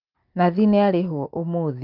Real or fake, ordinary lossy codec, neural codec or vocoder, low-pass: real; Opus, 24 kbps; none; 5.4 kHz